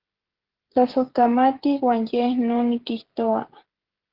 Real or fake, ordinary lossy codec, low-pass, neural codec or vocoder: fake; Opus, 16 kbps; 5.4 kHz; codec, 16 kHz, 16 kbps, FreqCodec, smaller model